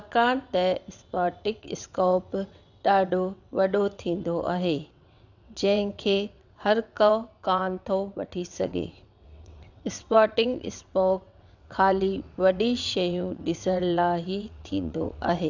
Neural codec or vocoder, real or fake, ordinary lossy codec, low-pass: vocoder, 22.05 kHz, 80 mel bands, WaveNeXt; fake; none; 7.2 kHz